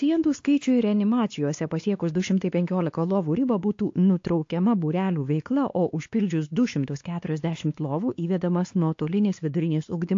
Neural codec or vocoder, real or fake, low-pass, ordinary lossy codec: codec, 16 kHz, 2 kbps, X-Codec, WavLM features, trained on Multilingual LibriSpeech; fake; 7.2 kHz; AAC, 48 kbps